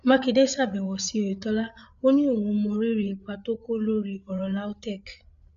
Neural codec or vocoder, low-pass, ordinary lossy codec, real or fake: codec, 16 kHz, 8 kbps, FreqCodec, larger model; 7.2 kHz; MP3, 96 kbps; fake